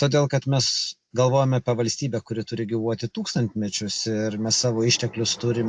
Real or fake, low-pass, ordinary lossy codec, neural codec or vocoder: real; 9.9 kHz; AAC, 64 kbps; none